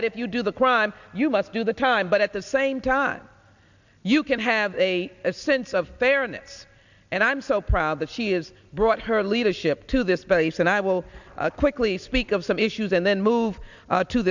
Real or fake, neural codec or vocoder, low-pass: real; none; 7.2 kHz